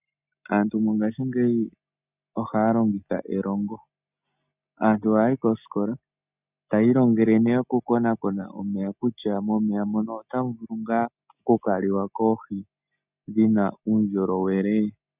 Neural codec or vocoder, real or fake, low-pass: none; real; 3.6 kHz